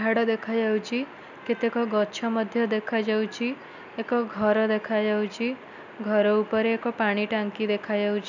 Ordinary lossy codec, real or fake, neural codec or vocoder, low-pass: none; real; none; 7.2 kHz